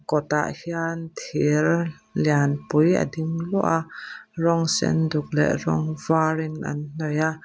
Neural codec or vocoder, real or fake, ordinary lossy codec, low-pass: none; real; none; none